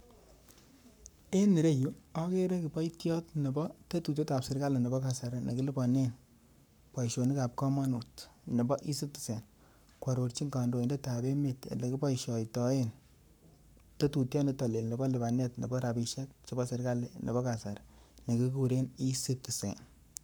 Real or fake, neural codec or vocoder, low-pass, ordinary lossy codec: fake; codec, 44.1 kHz, 7.8 kbps, Pupu-Codec; none; none